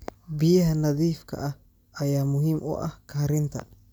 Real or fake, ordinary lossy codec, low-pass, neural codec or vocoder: real; none; none; none